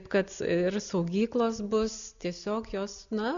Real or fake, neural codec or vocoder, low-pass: real; none; 7.2 kHz